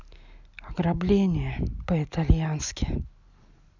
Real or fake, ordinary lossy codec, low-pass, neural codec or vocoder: real; none; 7.2 kHz; none